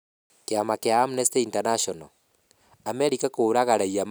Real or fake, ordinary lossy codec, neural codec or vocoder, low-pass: real; none; none; none